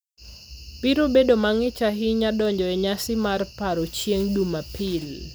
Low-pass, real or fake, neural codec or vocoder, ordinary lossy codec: none; real; none; none